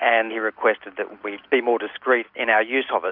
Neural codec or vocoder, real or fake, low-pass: none; real; 5.4 kHz